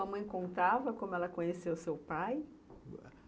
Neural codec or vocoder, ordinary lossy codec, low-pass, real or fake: none; none; none; real